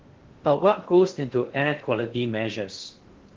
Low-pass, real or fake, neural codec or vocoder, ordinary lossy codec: 7.2 kHz; fake; codec, 16 kHz in and 24 kHz out, 0.6 kbps, FocalCodec, streaming, 4096 codes; Opus, 16 kbps